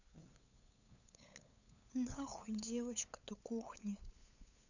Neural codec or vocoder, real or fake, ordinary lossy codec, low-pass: codec, 16 kHz, 8 kbps, FunCodec, trained on LibriTTS, 25 frames a second; fake; none; 7.2 kHz